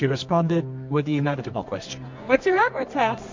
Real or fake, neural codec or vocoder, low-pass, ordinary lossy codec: fake; codec, 24 kHz, 0.9 kbps, WavTokenizer, medium music audio release; 7.2 kHz; MP3, 48 kbps